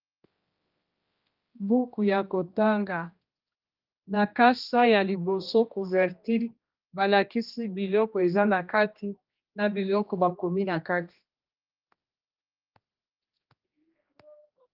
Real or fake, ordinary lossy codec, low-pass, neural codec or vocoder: fake; Opus, 32 kbps; 5.4 kHz; codec, 16 kHz, 1 kbps, X-Codec, HuBERT features, trained on general audio